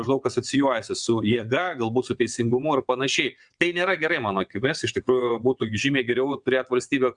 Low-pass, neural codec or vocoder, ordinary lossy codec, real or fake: 9.9 kHz; vocoder, 22.05 kHz, 80 mel bands, WaveNeXt; Opus, 64 kbps; fake